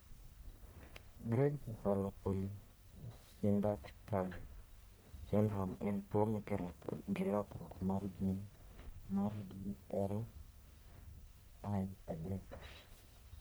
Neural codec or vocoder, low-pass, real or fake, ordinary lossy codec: codec, 44.1 kHz, 1.7 kbps, Pupu-Codec; none; fake; none